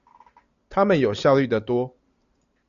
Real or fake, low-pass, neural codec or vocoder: real; 7.2 kHz; none